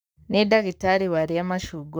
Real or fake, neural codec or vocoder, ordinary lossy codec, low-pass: fake; codec, 44.1 kHz, 7.8 kbps, Pupu-Codec; none; none